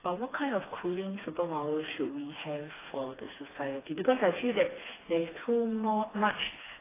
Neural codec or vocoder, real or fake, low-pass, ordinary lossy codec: codec, 16 kHz, 2 kbps, FreqCodec, smaller model; fake; 3.6 kHz; AAC, 16 kbps